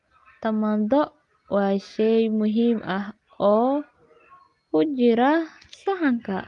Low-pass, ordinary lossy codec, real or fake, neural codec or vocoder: 10.8 kHz; Opus, 32 kbps; real; none